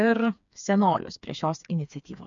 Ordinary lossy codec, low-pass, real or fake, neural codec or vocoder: MP3, 64 kbps; 7.2 kHz; fake; codec, 16 kHz, 4 kbps, FreqCodec, smaller model